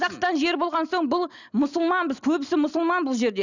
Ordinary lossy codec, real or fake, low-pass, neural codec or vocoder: none; real; 7.2 kHz; none